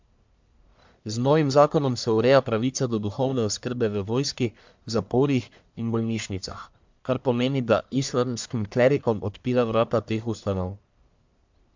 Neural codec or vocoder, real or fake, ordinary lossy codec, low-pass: codec, 44.1 kHz, 1.7 kbps, Pupu-Codec; fake; MP3, 64 kbps; 7.2 kHz